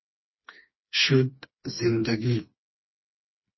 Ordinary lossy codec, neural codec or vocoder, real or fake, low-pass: MP3, 24 kbps; codec, 16 kHz, 2 kbps, FreqCodec, smaller model; fake; 7.2 kHz